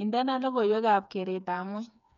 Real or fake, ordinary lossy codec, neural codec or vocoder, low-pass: fake; none; codec, 16 kHz, 4 kbps, FreqCodec, smaller model; 7.2 kHz